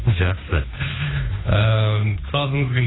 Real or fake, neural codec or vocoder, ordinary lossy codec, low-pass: fake; codec, 16 kHz, 2 kbps, FunCodec, trained on Chinese and English, 25 frames a second; AAC, 16 kbps; 7.2 kHz